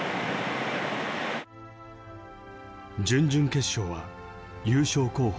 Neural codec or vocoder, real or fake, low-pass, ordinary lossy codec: none; real; none; none